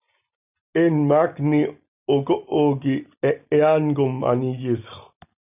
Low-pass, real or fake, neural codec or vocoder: 3.6 kHz; real; none